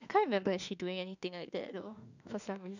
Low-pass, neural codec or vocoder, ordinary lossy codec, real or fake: 7.2 kHz; autoencoder, 48 kHz, 32 numbers a frame, DAC-VAE, trained on Japanese speech; none; fake